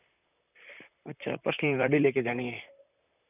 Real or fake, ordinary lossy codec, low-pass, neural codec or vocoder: fake; none; 3.6 kHz; vocoder, 44.1 kHz, 128 mel bands, Pupu-Vocoder